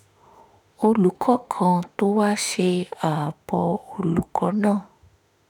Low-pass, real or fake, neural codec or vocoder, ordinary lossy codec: none; fake; autoencoder, 48 kHz, 32 numbers a frame, DAC-VAE, trained on Japanese speech; none